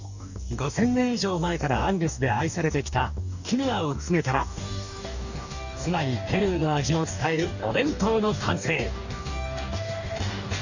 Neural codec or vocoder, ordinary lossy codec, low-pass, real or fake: codec, 44.1 kHz, 2.6 kbps, DAC; none; 7.2 kHz; fake